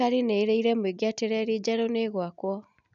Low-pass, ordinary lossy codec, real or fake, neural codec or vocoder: 7.2 kHz; none; real; none